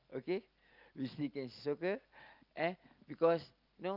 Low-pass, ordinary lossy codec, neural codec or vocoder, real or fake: 5.4 kHz; Opus, 24 kbps; none; real